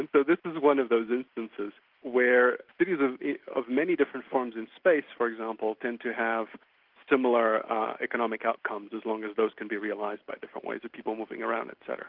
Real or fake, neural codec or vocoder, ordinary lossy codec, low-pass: real; none; Opus, 24 kbps; 5.4 kHz